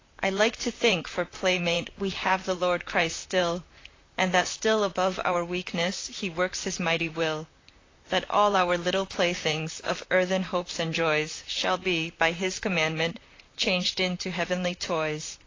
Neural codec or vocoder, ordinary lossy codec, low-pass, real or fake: vocoder, 44.1 kHz, 128 mel bands, Pupu-Vocoder; AAC, 32 kbps; 7.2 kHz; fake